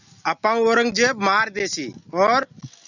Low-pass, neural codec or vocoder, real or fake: 7.2 kHz; none; real